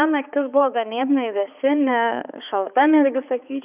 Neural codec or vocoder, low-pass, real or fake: codec, 16 kHz, 4 kbps, X-Codec, HuBERT features, trained on balanced general audio; 3.6 kHz; fake